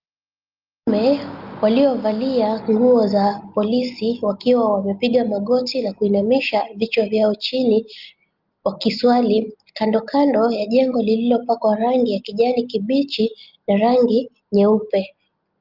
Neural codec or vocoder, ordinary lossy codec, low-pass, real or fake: none; Opus, 32 kbps; 5.4 kHz; real